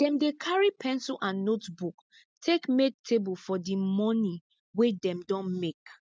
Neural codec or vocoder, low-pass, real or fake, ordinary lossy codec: none; none; real; none